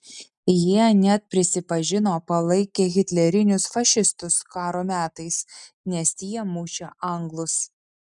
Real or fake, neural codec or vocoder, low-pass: real; none; 10.8 kHz